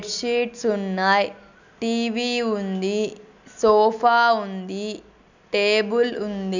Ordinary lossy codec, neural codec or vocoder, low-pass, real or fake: none; none; 7.2 kHz; real